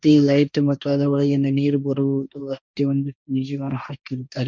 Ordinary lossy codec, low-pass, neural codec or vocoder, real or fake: none; none; codec, 16 kHz, 1.1 kbps, Voila-Tokenizer; fake